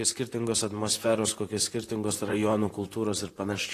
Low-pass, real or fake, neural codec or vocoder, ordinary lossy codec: 14.4 kHz; fake; vocoder, 44.1 kHz, 128 mel bands, Pupu-Vocoder; AAC, 48 kbps